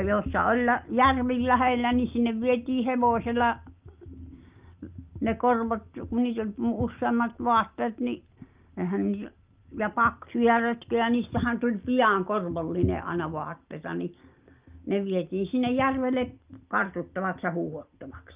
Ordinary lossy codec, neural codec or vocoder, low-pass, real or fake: Opus, 32 kbps; none; 3.6 kHz; real